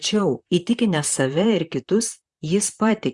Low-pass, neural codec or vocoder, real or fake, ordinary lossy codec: 10.8 kHz; vocoder, 44.1 kHz, 128 mel bands, Pupu-Vocoder; fake; Opus, 64 kbps